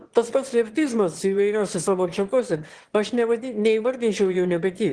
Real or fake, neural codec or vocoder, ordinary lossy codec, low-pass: fake; autoencoder, 22.05 kHz, a latent of 192 numbers a frame, VITS, trained on one speaker; Opus, 16 kbps; 9.9 kHz